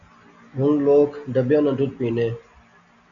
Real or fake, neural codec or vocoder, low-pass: real; none; 7.2 kHz